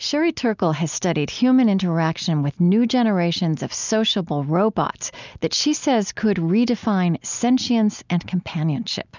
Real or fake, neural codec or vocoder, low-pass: real; none; 7.2 kHz